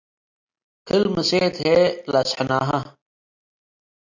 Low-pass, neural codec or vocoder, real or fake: 7.2 kHz; none; real